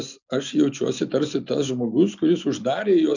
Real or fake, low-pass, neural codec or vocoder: real; 7.2 kHz; none